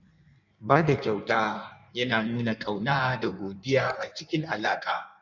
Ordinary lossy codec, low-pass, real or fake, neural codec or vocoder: none; 7.2 kHz; fake; codec, 16 kHz in and 24 kHz out, 1.1 kbps, FireRedTTS-2 codec